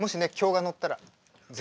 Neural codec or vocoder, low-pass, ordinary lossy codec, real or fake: none; none; none; real